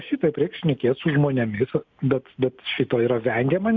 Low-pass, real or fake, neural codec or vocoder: 7.2 kHz; real; none